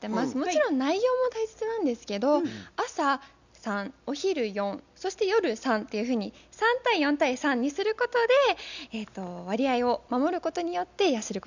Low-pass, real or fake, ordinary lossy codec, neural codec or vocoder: 7.2 kHz; real; none; none